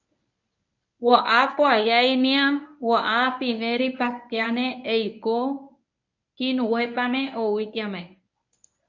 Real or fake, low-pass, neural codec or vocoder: fake; 7.2 kHz; codec, 24 kHz, 0.9 kbps, WavTokenizer, medium speech release version 1